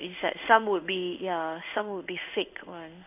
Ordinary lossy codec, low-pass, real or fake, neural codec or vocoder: none; 3.6 kHz; fake; codec, 16 kHz in and 24 kHz out, 1 kbps, XY-Tokenizer